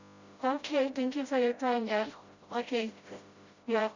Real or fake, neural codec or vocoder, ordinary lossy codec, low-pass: fake; codec, 16 kHz, 0.5 kbps, FreqCodec, smaller model; Opus, 64 kbps; 7.2 kHz